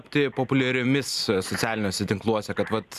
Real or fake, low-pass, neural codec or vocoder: real; 14.4 kHz; none